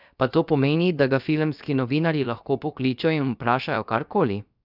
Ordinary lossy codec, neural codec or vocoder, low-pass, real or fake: none; codec, 16 kHz, about 1 kbps, DyCAST, with the encoder's durations; 5.4 kHz; fake